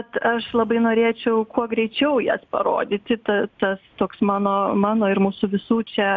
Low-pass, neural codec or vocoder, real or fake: 7.2 kHz; none; real